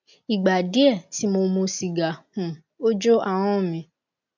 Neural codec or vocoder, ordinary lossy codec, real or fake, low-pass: none; none; real; 7.2 kHz